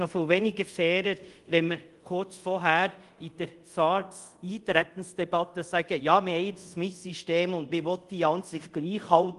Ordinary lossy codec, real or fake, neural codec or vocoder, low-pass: Opus, 24 kbps; fake; codec, 24 kHz, 0.5 kbps, DualCodec; 10.8 kHz